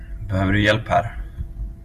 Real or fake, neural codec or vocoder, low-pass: real; none; 14.4 kHz